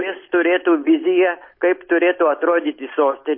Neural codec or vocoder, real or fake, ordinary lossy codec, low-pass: none; real; MP3, 24 kbps; 5.4 kHz